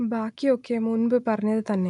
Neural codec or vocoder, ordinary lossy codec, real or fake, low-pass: none; none; real; 10.8 kHz